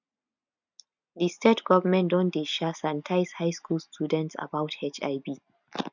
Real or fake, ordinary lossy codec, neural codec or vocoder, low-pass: real; none; none; 7.2 kHz